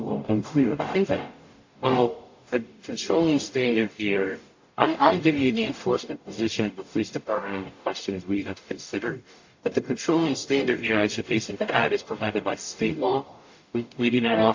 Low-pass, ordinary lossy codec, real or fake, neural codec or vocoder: 7.2 kHz; AAC, 48 kbps; fake; codec, 44.1 kHz, 0.9 kbps, DAC